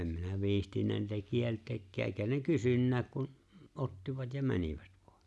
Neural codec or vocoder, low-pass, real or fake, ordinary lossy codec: none; none; real; none